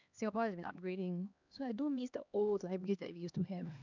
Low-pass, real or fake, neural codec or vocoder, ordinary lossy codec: 7.2 kHz; fake; codec, 16 kHz, 2 kbps, X-Codec, HuBERT features, trained on LibriSpeech; none